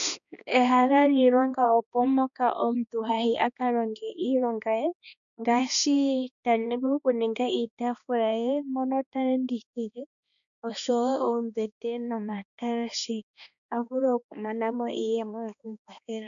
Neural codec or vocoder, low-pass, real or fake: codec, 16 kHz, 2 kbps, X-Codec, HuBERT features, trained on balanced general audio; 7.2 kHz; fake